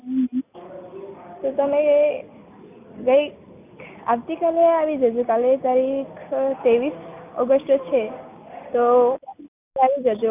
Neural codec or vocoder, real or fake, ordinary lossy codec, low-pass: none; real; none; 3.6 kHz